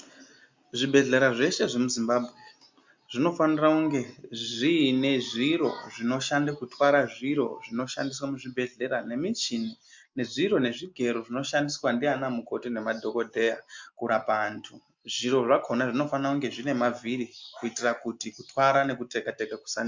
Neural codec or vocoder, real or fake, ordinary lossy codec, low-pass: none; real; MP3, 64 kbps; 7.2 kHz